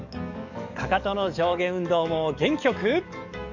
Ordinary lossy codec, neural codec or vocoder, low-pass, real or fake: none; codec, 44.1 kHz, 7.8 kbps, DAC; 7.2 kHz; fake